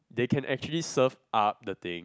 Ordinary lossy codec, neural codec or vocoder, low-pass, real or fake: none; none; none; real